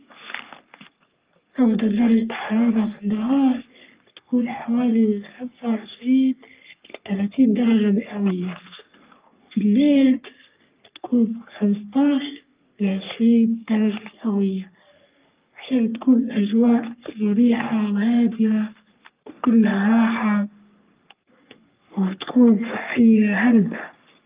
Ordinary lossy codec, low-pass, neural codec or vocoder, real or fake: Opus, 24 kbps; 3.6 kHz; codec, 44.1 kHz, 3.4 kbps, Pupu-Codec; fake